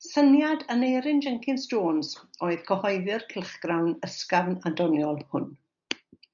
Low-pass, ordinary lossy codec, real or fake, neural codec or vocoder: 7.2 kHz; MP3, 96 kbps; real; none